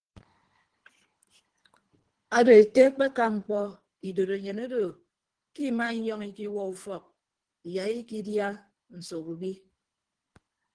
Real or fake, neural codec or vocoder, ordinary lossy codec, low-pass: fake; codec, 24 kHz, 3 kbps, HILCodec; Opus, 16 kbps; 9.9 kHz